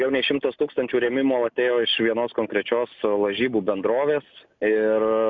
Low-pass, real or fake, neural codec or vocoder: 7.2 kHz; real; none